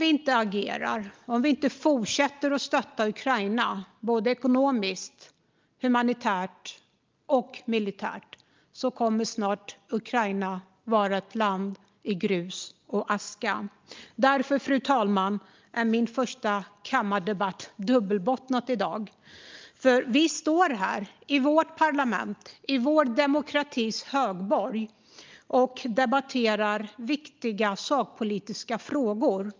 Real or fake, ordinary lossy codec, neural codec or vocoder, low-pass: real; Opus, 24 kbps; none; 7.2 kHz